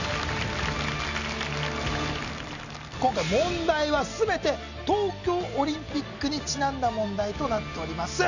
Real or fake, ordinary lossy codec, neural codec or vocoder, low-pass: real; none; none; 7.2 kHz